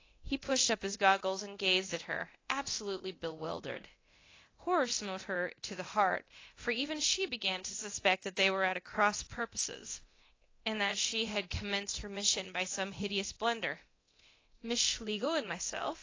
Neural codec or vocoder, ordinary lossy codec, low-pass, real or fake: codec, 24 kHz, 0.9 kbps, DualCodec; AAC, 32 kbps; 7.2 kHz; fake